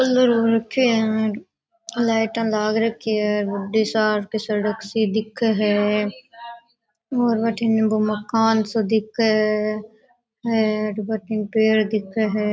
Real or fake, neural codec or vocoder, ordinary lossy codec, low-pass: real; none; none; none